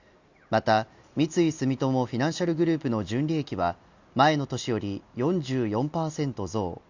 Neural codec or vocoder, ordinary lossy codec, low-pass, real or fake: none; Opus, 64 kbps; 7.2 kHz; real